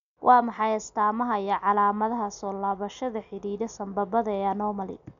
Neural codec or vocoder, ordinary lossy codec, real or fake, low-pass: none; none; real; 7.2 kHz